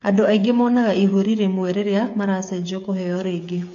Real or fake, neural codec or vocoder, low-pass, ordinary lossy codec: fake; codec, 16 kHz, 8 kbps, FreqCodec, smaller model; 7.2 kHz; AAC, 48 kbps